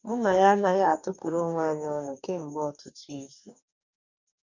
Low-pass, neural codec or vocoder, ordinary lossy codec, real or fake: 7.2 kHz; codec, 44.1 kHz, 2.6 kbps, DAC; none; fake